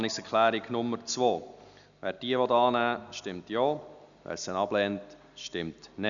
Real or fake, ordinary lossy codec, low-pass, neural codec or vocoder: real; none; 7.2 kHz; none